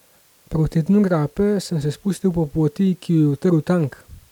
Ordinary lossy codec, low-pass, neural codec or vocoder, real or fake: none; 19.8 kHz; none; real